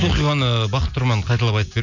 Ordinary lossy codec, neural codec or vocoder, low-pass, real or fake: none; none; 7.2 kHz; real